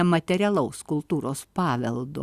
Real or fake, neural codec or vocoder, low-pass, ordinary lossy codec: real; none; 14.4 kHz; Opus, 64 kbps